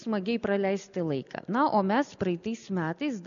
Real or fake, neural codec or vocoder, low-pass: real; none; 7.2 kHz